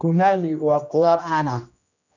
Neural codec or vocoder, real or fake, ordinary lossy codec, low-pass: codec, 16 kHz, 1 kbps, X-Codec, HuBERT features, trained on general audio; fake; none; 7.2 kHz